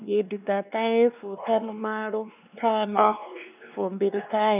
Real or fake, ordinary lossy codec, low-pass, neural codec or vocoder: fake; none; 3.6 kHz; codec, 16 kHz, 2 kbps, X-Codec, WavLM features, trained on Multilingual LibriSpeech